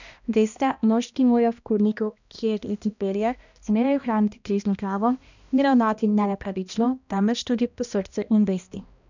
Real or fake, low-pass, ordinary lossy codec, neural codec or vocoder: fake; 7.2 kHz; none; codec, 16 kHz, 1 kbps, X-Codec, HuBERT features, trained on balanced general audio